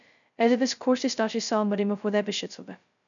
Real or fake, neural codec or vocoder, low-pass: fake; codec, 16 kHz, 0.2 kbps, FocalCodec; 7.2 kHz